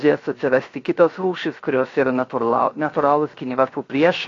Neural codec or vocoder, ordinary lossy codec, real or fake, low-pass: codec, 16 kHz, 0.7 kbps, FocalCodec; AAC, 32 kbps; fake; 7.2 kHz